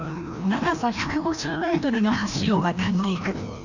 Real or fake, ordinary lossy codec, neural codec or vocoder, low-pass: fake; none; codec, 16 kHz, 1 kbps, FreqCodec, larger model; 7.2 kHz